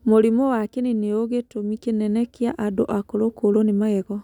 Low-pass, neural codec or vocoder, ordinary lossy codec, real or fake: 19.8 kHz; none; none; real